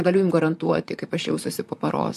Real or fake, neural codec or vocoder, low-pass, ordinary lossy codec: fake; vocoder, 44.1 kHz, 128 mel bands, Pupu-Vocoder; 14.4 kHz; MP3, 64 kbps